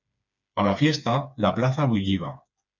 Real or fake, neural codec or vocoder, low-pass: fake; codec, 16 kHz, 4 kbps, FreqCodec, smaller model; 7.2 kHz